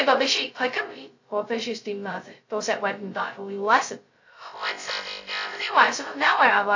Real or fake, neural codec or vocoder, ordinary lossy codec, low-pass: fake; codec, 16 kHz, 0.2 kbps, FocalCodec; AAC, 48 kbps; 7.2 kHz